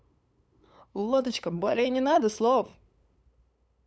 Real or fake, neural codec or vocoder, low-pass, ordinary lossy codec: fake; codec, 16 kHz, 8 kbps, FunCodec, trained on LibriTTS, 25 frames a second; none; none